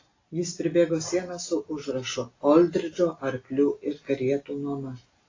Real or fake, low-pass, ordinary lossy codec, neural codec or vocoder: real; 7.2 kHz; AAC, 32 kbps; none